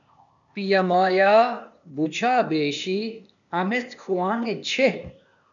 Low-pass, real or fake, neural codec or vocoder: 7.2 kHz; fake; codec, 16 kHz, 0.8 kbps, ZipCodec